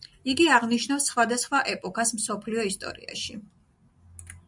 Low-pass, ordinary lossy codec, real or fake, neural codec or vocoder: 10.8 kHz; MP3, 96 kbps; real; none